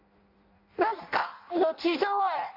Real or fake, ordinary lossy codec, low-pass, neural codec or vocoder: fake; MP3, 32 kbps; 5.4 kHz; codec, 16 kHz in and 24 kHz out, 0.6 kbps, FireRedTTS-2 codec